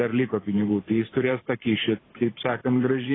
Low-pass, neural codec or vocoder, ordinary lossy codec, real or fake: 7.2 kHz; vocoder, 44.1 kHz, 128 mel bands every 512 samples, BigVGAN v2; AAC, 16 kbps; fake